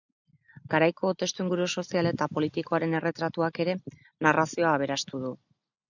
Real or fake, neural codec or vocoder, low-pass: real; none; 7.2 kHz